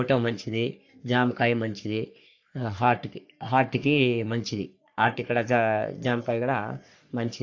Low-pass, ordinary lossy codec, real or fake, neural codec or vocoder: 7.2 kHz; AAC, 48 kbps; fake; codec, 44.1 kHz, 3.4 kbps, Pupu-Codec